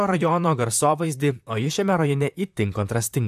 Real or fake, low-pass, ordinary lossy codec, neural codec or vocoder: fake; 14.4 kHz; AAC, 96 kbps; vocoder, 44.1 kHz, 128 mel bands, Pupu-Vocoder